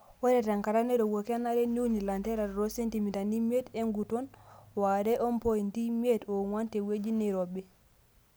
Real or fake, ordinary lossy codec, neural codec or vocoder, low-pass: real; none; none; none